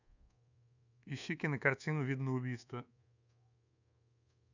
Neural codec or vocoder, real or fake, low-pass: codec, 24 kHz, 1.2 kbps, DualCodec; fake; 7.2 kHz